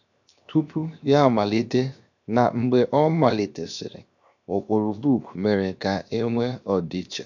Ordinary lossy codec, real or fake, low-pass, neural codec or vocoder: none; fake; 7.2 kHz; codec, 16 kHz, 0.7 kbps, FocalCodec